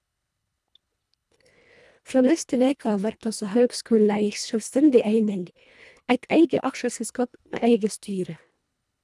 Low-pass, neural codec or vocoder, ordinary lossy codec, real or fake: none; codec, 24 kHz, 1.5 kbps, HILCodec; none; fake